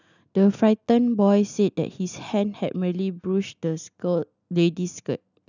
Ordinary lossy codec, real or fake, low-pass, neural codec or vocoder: none; real; 7.2 kHz; none